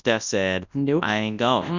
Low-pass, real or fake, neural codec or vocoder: 7.2 kHz; fake; codec, 24 kHz, 0.9 kbps, WavTokenizer, large speech release